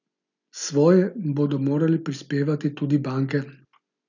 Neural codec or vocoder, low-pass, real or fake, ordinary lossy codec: none; 7.2 kHz; real; none